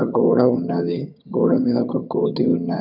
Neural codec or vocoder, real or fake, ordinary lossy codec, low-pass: vocoder, 22.05 kHz, 80 mel bands, HiFi-GAN; fake; none; 5.4 kHz